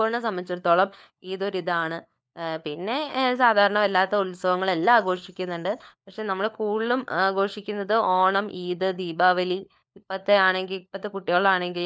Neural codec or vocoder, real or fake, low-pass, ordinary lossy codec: codec, 16 kHz, 4 kbps, FunCodec, trained on LibriTTS, 50 frames a second; fake; none; none